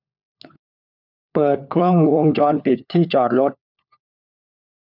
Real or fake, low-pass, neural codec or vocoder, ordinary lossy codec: fake; 5.4 kHz; codec, 16 kHz, 4 kbps, FunCodec, trained on LibriTTS, 50 frames a second; none